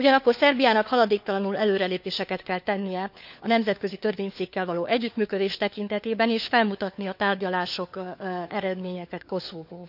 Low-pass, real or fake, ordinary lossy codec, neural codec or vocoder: 5.4 kHz; fake; none; codec, 16 kHz, 4 kbps, FunCodec, trained on LibriTTS, 50 frames a second